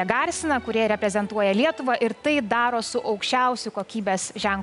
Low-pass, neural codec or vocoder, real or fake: 10.8 kHz; none; real